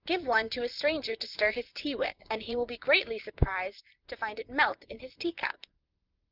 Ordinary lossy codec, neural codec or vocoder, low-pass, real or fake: Opus, 32 kbps; vocoder, 44.1 kHz, 128 mel bands, Pupu-Vocoder; 5.4 kHz; fake